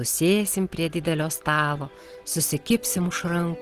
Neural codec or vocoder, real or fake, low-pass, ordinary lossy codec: none; real; 14.4 kHz; Opus, 16 kbps